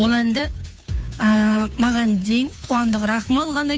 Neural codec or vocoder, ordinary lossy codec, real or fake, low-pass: codec, 16 kHz, 2 kbps, FunCodec, trained on Chinese and English, 25 frames a second; none; fake; none